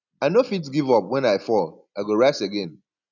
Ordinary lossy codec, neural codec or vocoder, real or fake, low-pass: none; none; real; 7.2 kHz